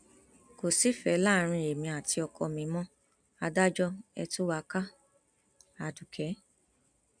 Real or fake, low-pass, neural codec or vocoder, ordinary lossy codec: real; 9.9 kHz; none; none